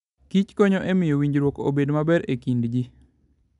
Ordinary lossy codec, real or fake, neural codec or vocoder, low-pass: none; real; none; 10.8 kHz